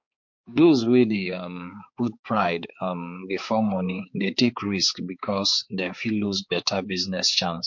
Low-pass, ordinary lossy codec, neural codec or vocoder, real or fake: 7.2 kHz; MP3, 48 kbps; codec, 16 kHz, 4 kbps, X-Codec, HuBERT features, trained on balanced general audio; fake